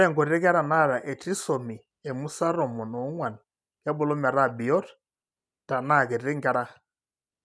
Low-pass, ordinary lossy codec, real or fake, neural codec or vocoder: none; none; real; none